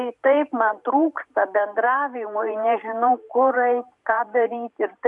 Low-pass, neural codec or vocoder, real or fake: 10.8 kHz; vocoder, 44.1 kHz, 128 mel bands every 512 samples, BigVGAN v2; fake